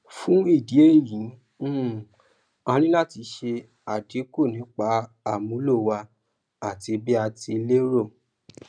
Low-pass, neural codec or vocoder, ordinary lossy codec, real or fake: 9.9 kHz; none; none; real